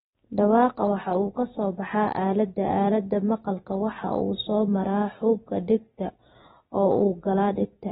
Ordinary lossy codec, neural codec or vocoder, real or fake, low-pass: AAC, 16 kbps; none; real; 19.8 kHz